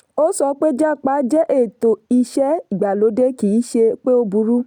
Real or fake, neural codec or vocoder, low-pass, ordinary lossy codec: real; none; 19.8 kHz; none